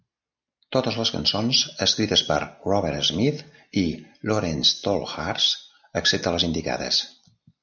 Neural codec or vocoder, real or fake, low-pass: none; real; 7.2 kHz